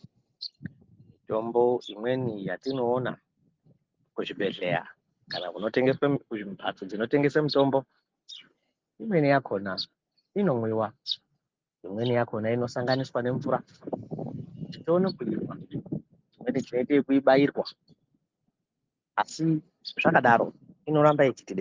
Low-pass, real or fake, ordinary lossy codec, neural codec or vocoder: 7.2 kHz; real; Opus, 32 kbps; none